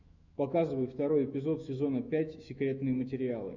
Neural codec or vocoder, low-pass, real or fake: autoencoder, 48 kHz, 128 numbers a frame, DAC-VAE, trained on Japanese speech; 7.2 kHz; fake